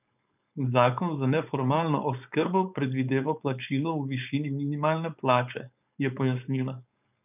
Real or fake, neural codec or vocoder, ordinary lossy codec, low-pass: fake; codec, 16 kHz, 4.8 kbps, FACodec; none; 3.6 kHz